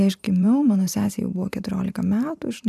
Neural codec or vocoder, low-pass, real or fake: vocoder, 44.1 kHz, 128 mel bands every 512 samples, BigVGAN v2; 14.4 kHz; fake